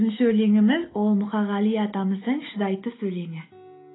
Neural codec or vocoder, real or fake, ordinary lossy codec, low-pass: none; real; AAC, 16 kbps; 7.2 kHz